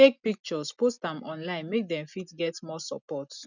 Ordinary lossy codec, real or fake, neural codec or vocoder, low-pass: none; real; none; 7.2 kHz